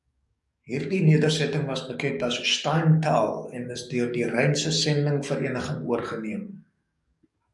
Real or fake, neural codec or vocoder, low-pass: fake; codec, 44.1 kHz, 7.8 kbps, DAC; 10.8 kHz